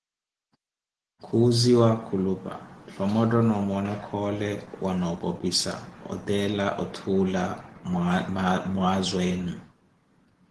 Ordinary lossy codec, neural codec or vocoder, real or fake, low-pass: Opus, 16 kbps; none; real; 10.8 kHz